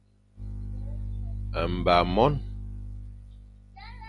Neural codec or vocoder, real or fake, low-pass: none; real; 10.8 kHz